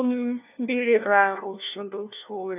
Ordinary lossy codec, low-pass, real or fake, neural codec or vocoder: none; 3.6 kHz; fake; codec, 16 kHz, 1 kbps, FunCodec, trained on LibriTTS, 50 frames a second